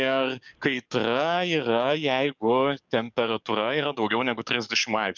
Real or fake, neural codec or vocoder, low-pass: fake; codec, 44.1 kHz, 7.8 kbps, DAC; 7.2 kHz